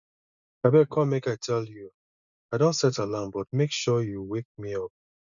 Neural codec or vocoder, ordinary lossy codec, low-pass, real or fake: none; none; 7.2 kHz; real